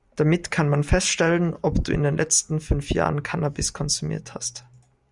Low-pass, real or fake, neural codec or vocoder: 10.8 kHz; real; none